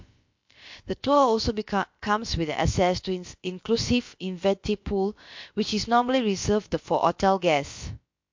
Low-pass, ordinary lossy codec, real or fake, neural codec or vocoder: 7.2 kHz; MP3, 48 kbps; fake; codec, 16 kHz, about 1 kbps, DyCAST, with the encoder's durations